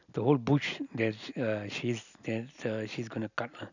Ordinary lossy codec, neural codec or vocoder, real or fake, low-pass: none; none; real; 7.2 kHz